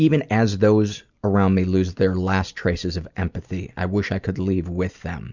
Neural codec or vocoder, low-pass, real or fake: none; 7.2 kHz; real